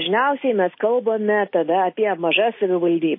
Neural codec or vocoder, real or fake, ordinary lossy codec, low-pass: none; real; MP3, 24 kbps; 5.4 kHz